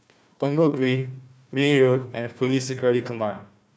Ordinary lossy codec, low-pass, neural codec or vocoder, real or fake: none; none; codec, 16 kHz, 1 kbps, FunCodec, trained on Chinese and English, 50 frames a second; fake